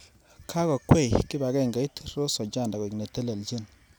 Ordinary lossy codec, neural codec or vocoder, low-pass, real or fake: none; none; none; real